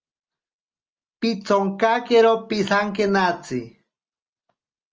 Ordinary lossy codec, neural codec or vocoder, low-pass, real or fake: Opus, 24 kbps; none; 7.2 kHz; real